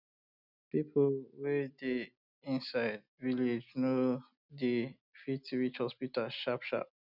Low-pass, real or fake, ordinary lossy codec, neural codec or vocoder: 5.4 kHz; real; none; none